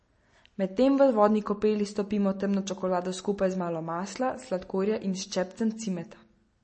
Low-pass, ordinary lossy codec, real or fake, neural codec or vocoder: 10.8 kHz; MP3, 32 kbps; real; none